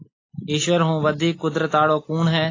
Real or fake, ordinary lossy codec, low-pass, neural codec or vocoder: real; AAC, 32 kbps; 7.2 kHz; none